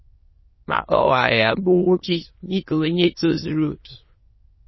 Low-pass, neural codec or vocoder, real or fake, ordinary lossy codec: 7.2 kHz; autoencoder, 22.05 kHz, a latent of 192 numbers a frame, VITS, trained on many speakers; fake; MP3, 24 kbps